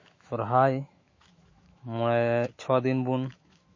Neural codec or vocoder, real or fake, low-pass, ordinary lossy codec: autoencoder, 48 kHz, 128 numbers a frame, DAC-VAE, trained on Japanese speech; fake; 7.2 kHz; MP3, 32 kbps